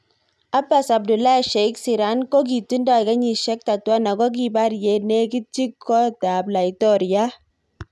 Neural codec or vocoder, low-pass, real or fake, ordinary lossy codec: none; none; real; none